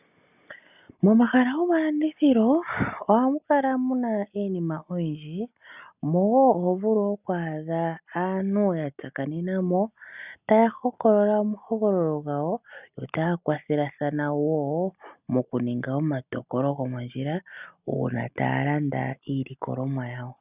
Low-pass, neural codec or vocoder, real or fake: 3.6 kHz; none; real